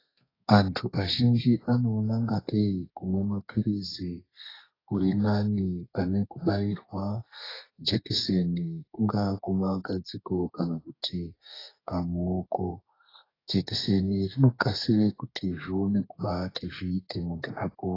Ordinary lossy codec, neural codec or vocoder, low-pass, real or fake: AAC, 24 kbps; codec, 32 kHz, 1.9 kbps, SNAC; 5.4 kHz; fake